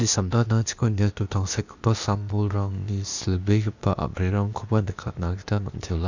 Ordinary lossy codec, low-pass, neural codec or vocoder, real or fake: none; 7.2 kHz; codec, 16 kHz, 0.8 kbps, ZipCodec; fake